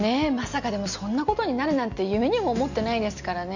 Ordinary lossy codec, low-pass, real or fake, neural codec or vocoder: none; 7.2 kHz; real; none